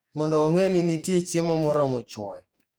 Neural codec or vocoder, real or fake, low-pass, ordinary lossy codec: codec, 44.1 kHz, 2.6 kbps, DAC; fake; none; none